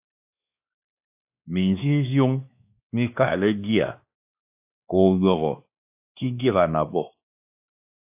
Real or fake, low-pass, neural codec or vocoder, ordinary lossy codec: fake; 3.6 kHz; codec, 16 kHz, 2 kbps, X-Codec, WavLM features, trained on Multilingual LibriSpeech; AAC, 32 kbps